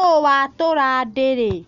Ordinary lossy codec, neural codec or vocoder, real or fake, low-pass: Opus, 64 kbps; none; real; 7.2 kHz